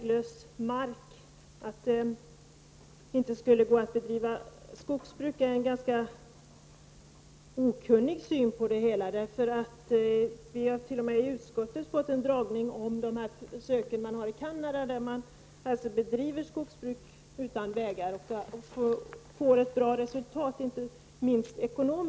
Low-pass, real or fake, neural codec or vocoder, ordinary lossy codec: none; real; none; none